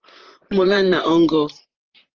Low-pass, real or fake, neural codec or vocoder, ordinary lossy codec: 7.2 kHz; fake; vocoder, 44.1 kHz, 128 mel bands, Pupu-Vocoder; Opus, 16 kbps